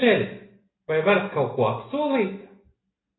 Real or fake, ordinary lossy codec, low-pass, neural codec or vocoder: real; AAC, 16 kbps; 7.2 kHz; none